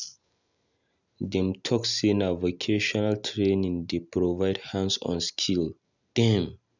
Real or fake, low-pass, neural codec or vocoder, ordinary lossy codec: real; 7.2 kHz; none; none